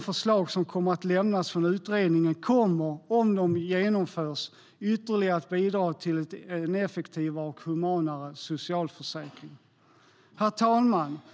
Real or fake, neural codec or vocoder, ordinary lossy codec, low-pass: real; none; none; none